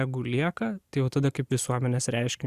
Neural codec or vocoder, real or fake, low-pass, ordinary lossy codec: none; real; 14.4 kHz; Opus, 64 kbps